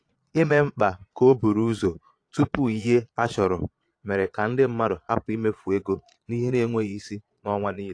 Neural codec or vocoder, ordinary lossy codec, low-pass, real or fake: vocoder, 22.05 kHz, 80 mel bands, Vocos; AAC, 48 kbps; 9.9 kHz; fake